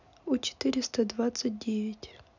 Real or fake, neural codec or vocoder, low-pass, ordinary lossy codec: real; none; 7.2 kHz; none